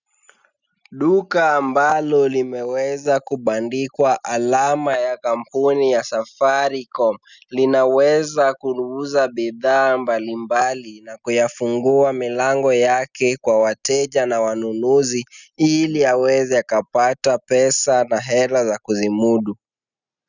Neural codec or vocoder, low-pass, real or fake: none; 7.2 kHz; real